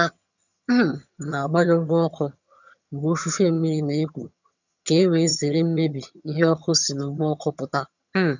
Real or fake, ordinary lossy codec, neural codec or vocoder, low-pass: fake; none; vocoder, 22.05 kHz, 80 mel bands, HiFi-GAN; 7.2 kHz